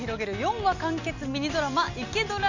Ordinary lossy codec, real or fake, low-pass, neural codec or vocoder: none; real; 7.2 kHz; none